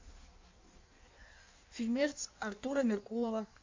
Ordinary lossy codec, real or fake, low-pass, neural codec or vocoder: MP3, 48 kbps; fake; 7.2 kHz; codec, 16 kHz in and 24 kHz out, 1.1 kbps, FireRedTTS-2 codec